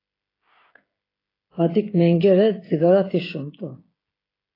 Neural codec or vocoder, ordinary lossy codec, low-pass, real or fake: codec, 16 kHz, 16 kbps, FreqCodec, smaller model; AAC, 24 kbps; 5.4 kHz; fake